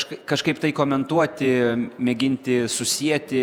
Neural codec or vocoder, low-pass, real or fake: vocoder, 48 kHz, 128 mel bands, Vocos; 19.8 kHz; fake